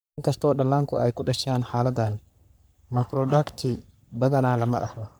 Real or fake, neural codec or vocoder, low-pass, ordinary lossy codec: fake; codec, 44.1 kHz, 3.4 kbps, Pupu-Codec; none; none